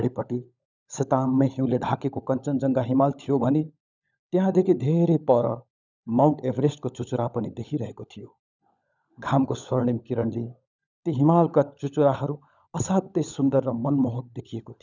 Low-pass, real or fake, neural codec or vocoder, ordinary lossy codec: 7.2 kHz; fake; codec, 16 kHz, 16 kbps, FunCodec, trained on LibriTTS, 50 frames a second; none